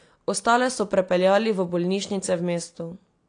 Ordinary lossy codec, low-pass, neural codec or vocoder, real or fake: AAC, 48 kbps; 9.9 kHz; none; real